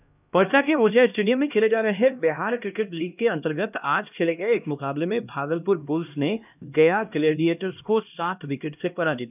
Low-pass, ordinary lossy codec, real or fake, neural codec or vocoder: 3.6 kHz; none; fake; codec, 16 kHz, 1 kbps, X-Codec, HuBERT features, trained on LibriSpeech